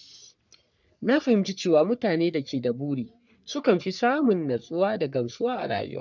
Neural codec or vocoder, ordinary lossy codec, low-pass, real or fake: codec, 44.1 kHz, 3.4 kbps, Pupu-Codec; none; 7.2 kHz; fake